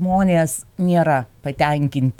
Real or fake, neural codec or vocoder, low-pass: fake; autoencoder, 48 kHz, 128 numbers a frame, DAC-VAE, trained on Japanese speech; 19.8 kHz